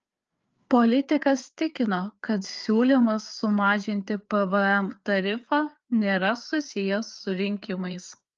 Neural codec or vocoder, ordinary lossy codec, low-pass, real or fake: codec, 16 kHz, 4 kbps, FreqCodec, larger model; Opus, 24 kbps; 7.2 kHz; fake